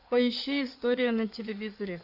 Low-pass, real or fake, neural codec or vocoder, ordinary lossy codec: 5.4 kHz; fake; codec, 16 kHz in and 24 kHz out, 2.2 kbps, FireRedTTS-2 codec; AAC, 48 kbps